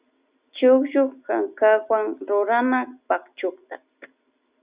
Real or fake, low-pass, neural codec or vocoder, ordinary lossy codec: real; 3.6 kHz; none; Opus, 64 kbps